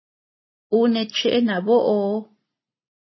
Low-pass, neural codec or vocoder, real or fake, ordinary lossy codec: 7.2 kHz; none; real; MP3, 24 kbps